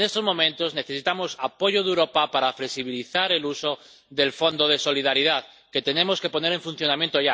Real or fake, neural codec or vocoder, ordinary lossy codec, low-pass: real; none; none; none